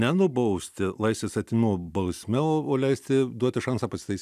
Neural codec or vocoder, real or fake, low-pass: none; real; 14.4 kHz